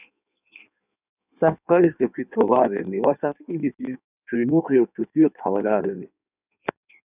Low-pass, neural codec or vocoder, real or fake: 3.6 kHz; codec, 16 kHz in and 24 kHz out, 1.1 kbps, FireRedTTS-2 codec; fake